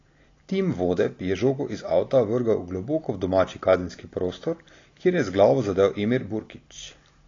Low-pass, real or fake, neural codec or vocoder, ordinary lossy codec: 7.2 kHz; real; none; AAC, 32 kbps